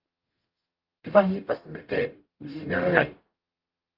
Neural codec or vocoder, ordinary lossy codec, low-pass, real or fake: codec, 44.1 kHz, 0.9 kbps, DAC; Opus, 24 kbps; 5.4 kHz; fake